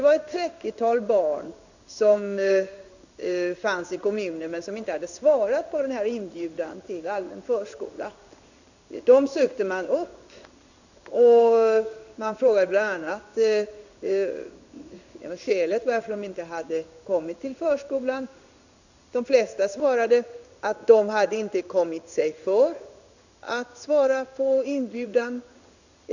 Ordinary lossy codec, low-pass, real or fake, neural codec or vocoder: none; 7.2 kHz; fake; codec, 16 kHz in and 24 kHz out, 1 kbps, XY-Tokenizer